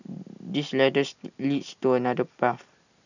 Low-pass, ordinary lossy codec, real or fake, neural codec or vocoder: 7.2 kHz; none; real; none